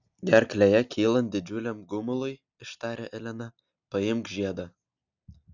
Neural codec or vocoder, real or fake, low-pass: none; real; 7.2 kHz